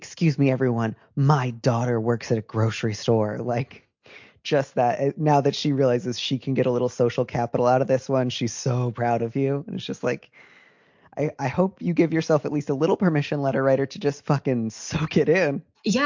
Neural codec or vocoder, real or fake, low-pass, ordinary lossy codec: none; real; 7.2 kHz; MP3, 48 kbps